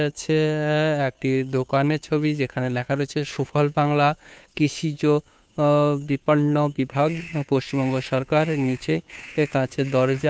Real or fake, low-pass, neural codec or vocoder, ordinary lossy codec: fake; none; codec, 16 kHz, 2 kbps, FunCodec, trained on Chinese and English, 25 frames a second; none